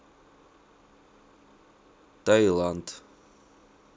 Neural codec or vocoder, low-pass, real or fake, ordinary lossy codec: none; none; real; none